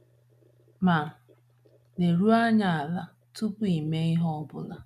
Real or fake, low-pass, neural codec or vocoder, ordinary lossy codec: real; 14.4 kHz; none; none